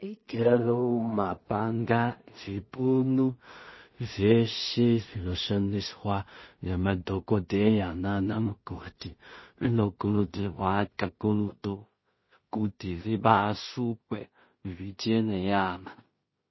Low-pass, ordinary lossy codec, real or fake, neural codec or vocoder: 7.2 kHz; MP3, 24 kbps; fake; codec, 16 kHz in and 24 kHz out, 0.4 kbps, LongCat-Audio-Codec, two codebook decoder